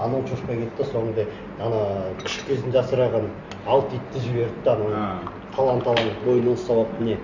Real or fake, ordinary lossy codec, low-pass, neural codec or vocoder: real; Opus, 64 kbps; 7.2 kHz; none